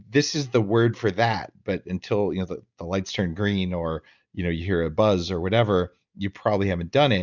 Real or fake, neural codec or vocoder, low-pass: real; none; 7.2 kHz